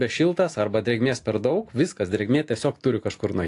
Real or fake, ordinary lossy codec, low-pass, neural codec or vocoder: real; AAC, 48 kbps; 10.8 kHz; none